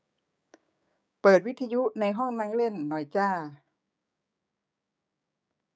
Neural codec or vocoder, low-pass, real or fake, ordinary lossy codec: codec, 16 kHz, 6 kbps, DAC; none; fake; none